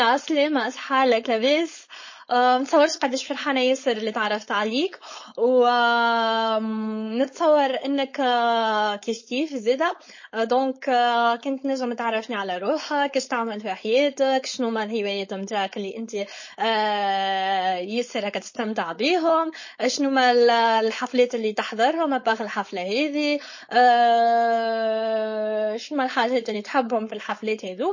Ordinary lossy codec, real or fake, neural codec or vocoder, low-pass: MP3, 32 kbps; fake; codec, 16 kHz, 4.8 kbps, FACodec; 7.2 kHz